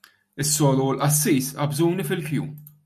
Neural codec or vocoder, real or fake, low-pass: none; real; 14.4 kHz